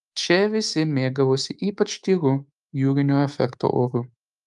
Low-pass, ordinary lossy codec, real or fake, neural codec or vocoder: 10.8 kHz; Opus, 32 kbps; fake; codec, 24 kHz, 3.1 kbps, DualCodec